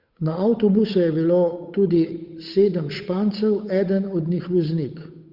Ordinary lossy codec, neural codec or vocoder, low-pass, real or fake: Opus, 64 kbps; codec, 16 kHz, 8 kbps, FunCodec, trained on Chinese and English, 25 frames a second; 5.4 kHz; fake